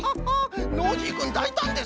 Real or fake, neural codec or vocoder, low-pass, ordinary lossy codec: real; none; none; none